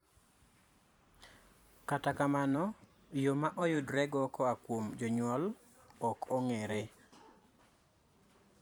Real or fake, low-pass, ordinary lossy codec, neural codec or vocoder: fake; none; none; vocoder, 44.1 kHz, 128 mel bands every 512 samples, BigVGAN v2